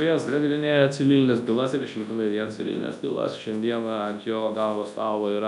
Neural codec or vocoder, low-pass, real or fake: codec, 24 kHz, 0.9 kbps, WavTokenizer, large speech release; 10.8 kHz; fake